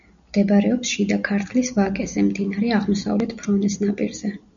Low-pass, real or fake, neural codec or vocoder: 7.2 kHz; real; none